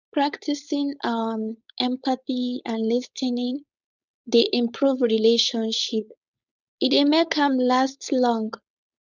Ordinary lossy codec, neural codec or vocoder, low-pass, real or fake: none; codec, 16 kHz, 4.8 kbps, FACodec; 7.2 kHz; fake